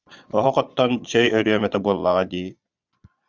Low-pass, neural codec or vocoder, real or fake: 7.2 kHz; vocoder, 22.05 kHz, 80 mel bands, Vocos; fake